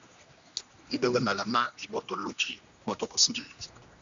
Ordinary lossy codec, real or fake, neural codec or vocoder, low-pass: Opus, 64 kbps; fake; codec, 16 kHz, 1 kbps, X-Codec, HuBERT features, trained on general audio; 7.2 kHz